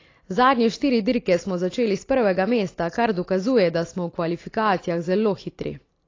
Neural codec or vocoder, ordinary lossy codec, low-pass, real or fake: none; AAC, 32 kbps; 7.2 kHz; real